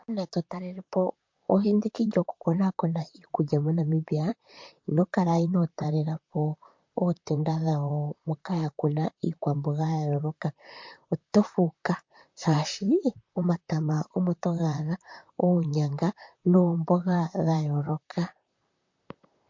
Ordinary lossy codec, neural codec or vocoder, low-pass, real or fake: MP3, 48 kbps; vocoder, 44.1 kHz, 128 mel bands, Pupu-Vocoder; 7.2 kHz; fake